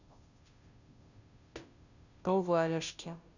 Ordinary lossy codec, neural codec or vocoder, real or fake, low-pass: none; codec, 16 kHz, 0.5 kbps, FunCodec, trained on Chinese and English, 25 frames a second; fake; 7.2 kHz